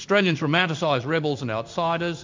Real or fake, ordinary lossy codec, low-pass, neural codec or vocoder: fake; MP3, 64 kbps; 7.2 kHz; codec, 16 kHz in and 24 kHz out, 1 kbps, XY-Tokenizer